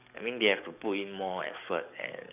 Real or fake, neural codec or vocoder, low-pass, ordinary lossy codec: fake; codec, 44.1 kHz, 7.8 kbps, DAC; 3.6 kHz; none